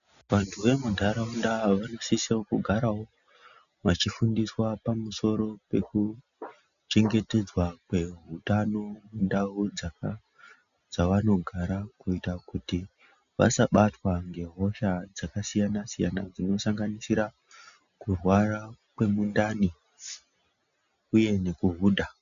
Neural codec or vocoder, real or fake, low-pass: none; real; 7.2 kHz